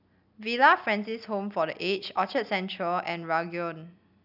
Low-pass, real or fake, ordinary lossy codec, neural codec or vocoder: 5.4 kHz; real; none; none